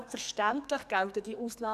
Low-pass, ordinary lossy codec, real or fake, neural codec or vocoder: 14.4 kHz; none; fake; codec, 44.1 kHz, 2.6 kbps, SNAC